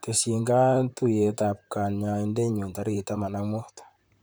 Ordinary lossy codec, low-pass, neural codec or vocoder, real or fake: none; none; vocoder, 44.1 kHz, 128 mel bands, Pupu-Vocoder; fake